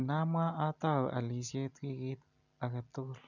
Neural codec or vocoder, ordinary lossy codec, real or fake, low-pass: none; none; real; 7.2 kHz